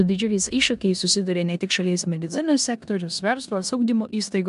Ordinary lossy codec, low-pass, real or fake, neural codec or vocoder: AAC, 96 kbps; 10.8 kHz; fake; codec, 16 kHz in and 24 kHz out, 0.9 kbps, LongCat-Audio-Codec, four codebook decoder